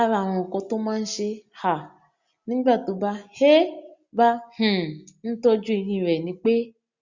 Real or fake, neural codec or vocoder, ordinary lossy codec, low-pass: real; none; Opus, 64 kbps; 7.2 kHz